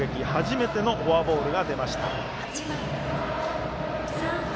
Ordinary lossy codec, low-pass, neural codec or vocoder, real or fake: none; none; none; real